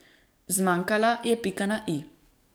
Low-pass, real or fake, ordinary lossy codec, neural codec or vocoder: none; fake; none; codec, 44.1 kHz, 7.8 kbps, DAC